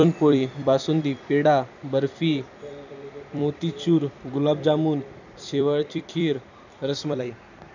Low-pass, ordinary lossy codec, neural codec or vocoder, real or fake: 7.2 kHz; none; vocoder, 44.1 kHz, 128 mel bands every 256 samples, BigVGAN v2; fake